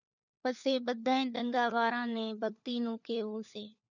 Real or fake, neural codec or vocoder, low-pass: fake; codec, 16 kHz, 4 kbps, FunCodec, trained on LibriTTS, 50 frames a second; 7.2 kHz